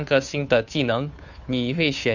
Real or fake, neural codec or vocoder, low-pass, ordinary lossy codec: fake; codec, 16 kHz, 2 kbps, FunCodec, trained on Chinese and English, 25 frames a second; 7.2 kHz; none